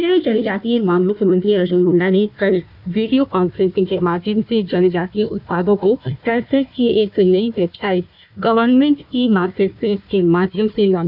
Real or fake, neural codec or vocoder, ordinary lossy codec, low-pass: fake; codec, 16 kHz, 1 kbps, FunCodec, trained on Chinese and English, 50 frames a second; none; 5.4 kHz